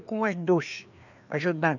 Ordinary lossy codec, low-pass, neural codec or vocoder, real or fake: none; 7.2 kHz; codec, 16 kHz, 2 kbps, FreqCodec, larger model; fake